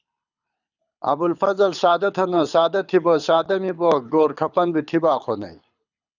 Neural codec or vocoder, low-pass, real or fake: codec, 24 kHz, 6 kbps, HILCodec; 7.2 kHz; fake